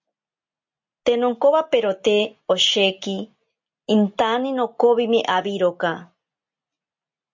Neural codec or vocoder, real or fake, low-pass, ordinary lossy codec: none; real; 7.2 kHz; MP3, 48 kbps